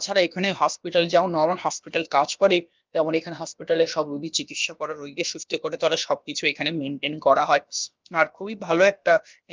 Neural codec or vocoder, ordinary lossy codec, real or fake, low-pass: codec, 16 kHz, about 1 kbps, DyCAST, with the encoder's durations; Opus, 24 kbps; fake; 7.2 kHz